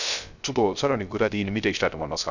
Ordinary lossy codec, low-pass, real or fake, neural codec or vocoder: none; 7.2 kHz; fake; codec, 16 kHz, 0.3 kbps, FocalCodec